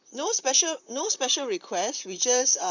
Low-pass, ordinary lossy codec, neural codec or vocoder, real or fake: 7.2 kHz; none; vocoder, 44.1 kHz, 128 mel bands, Pupu-Vocoder; fake